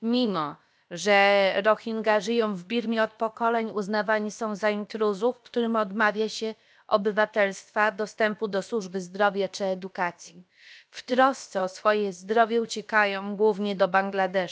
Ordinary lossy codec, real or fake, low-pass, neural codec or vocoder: none; fake; none; codec, 16 kHz, about 1 kbps, DyCAST, with the encoder's durations